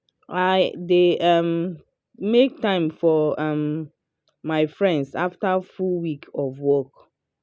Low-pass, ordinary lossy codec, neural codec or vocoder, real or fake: none; none; none; real